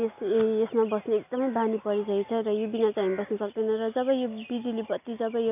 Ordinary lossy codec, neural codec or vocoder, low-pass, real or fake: none; none; 3.6 kHz; real